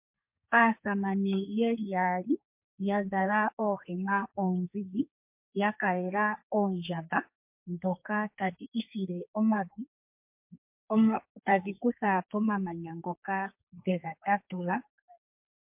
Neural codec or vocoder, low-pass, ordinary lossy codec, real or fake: codec, 32 kHz, 1.9 kbps, SNAC; 3.6 kHz; MP3, 32 kbps; fake